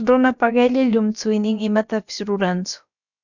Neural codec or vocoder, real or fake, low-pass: codec, 16 kHz, about 1 kbps, DyCAST, with the encoder's durations; fake; 7.2 kHz